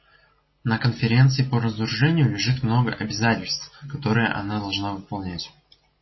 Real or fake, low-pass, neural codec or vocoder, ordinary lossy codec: real; 7.2 kHz; none; MP3, 24 kbps